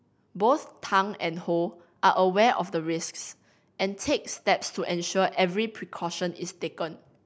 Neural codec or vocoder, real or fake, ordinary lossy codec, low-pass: none; real; none; none